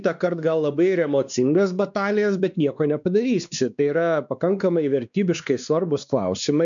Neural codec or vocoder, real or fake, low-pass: codec, 16 kHz, 2 kbps, X-Codec, WavLM features, trained on Multilingual LibriSpeech; fake; 7.2 kHz